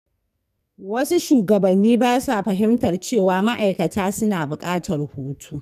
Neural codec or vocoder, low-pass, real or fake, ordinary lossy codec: codec, 44.1 kHz, 2.6 kbps, SNAC; 14.4 kHz; fake; none